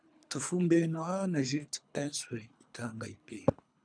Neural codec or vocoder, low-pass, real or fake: codec, 24 kHz, 3 kbps, HILCodec; 9.9 kHz; fake